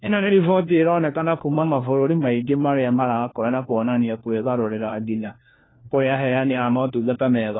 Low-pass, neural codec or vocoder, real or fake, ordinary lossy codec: 7.2 kHz; codec, 16 kHz, 1 kbps, FunCodec, trained on LibriTTS, 50 frames a second; fake; AAC, 16 kbps